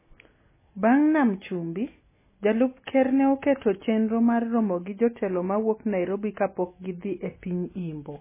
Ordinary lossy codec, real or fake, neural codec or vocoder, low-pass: MP3, 16 kbps; real; none; 3.6 kHz